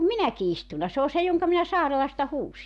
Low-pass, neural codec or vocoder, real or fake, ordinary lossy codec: none; none; real; none